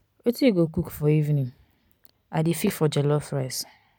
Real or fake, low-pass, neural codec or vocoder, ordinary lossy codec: real; none; none; none